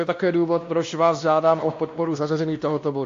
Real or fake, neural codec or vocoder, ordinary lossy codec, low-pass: fake; codec, 16 kHz, 1 kbps, X-Codec, WavLM features, trained on Multilingual LibriSpeech; AAC, 48 kbps; 7.2 kHz